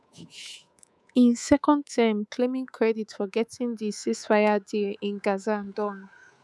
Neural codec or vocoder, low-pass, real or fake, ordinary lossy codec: codec, 24 kHz, 3.1 kbps, DualCodec; none; fake; none